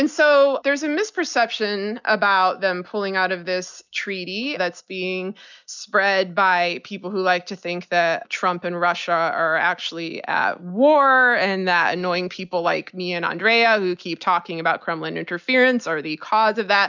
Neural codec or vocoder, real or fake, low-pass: none; real; 7.2 kHz